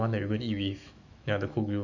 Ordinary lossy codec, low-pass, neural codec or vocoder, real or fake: none; 7.2 kHz; autoencoder, 48 kHz, 128 numbers a frame, DAC-VAE, trained on Japanese speech; fake